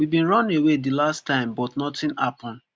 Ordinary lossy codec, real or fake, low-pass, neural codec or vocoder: none; real; none; none